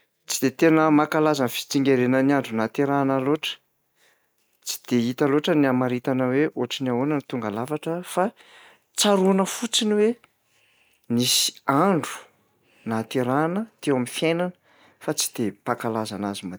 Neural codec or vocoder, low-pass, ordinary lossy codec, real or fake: none; none; none; real